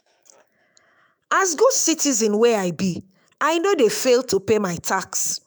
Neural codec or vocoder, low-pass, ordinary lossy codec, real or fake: autoencoder, 48 kHz, 128 numbers a frame, DAC-VAE, trained on Japanese speech; none; none; fake